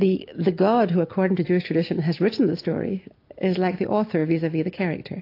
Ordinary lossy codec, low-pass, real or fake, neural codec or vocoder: AAC, 32 kbps; 5.4 kHz; real; none